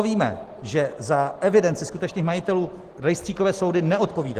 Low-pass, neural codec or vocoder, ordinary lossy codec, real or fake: 14.4 kHz; none; Opus, 16 kbps; real